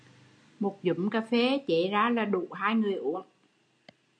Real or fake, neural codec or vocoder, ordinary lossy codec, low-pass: real; none; MP3, 64 kbps; 9.9 kHz